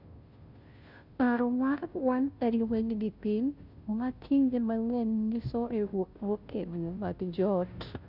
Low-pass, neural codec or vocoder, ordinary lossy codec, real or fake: 5.4 kHz; codec, 16 kHz, 0.5 kbps, FunCodec, trained on Chinese and English, 25 frames a second; Opus, 64 kbps; fake